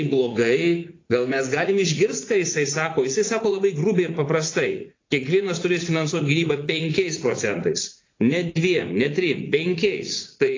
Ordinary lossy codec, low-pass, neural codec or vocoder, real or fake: AAC, 32 kbps; 7.2 kHz; vocoder, 44.1 kHz, 80 mel bands, Vocos; fake